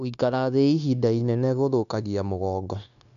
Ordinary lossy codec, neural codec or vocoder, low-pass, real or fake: none; codec, 16 kHz, 0.9 kbps, LongCat-Audio-Codec; 7.2 kHz; fake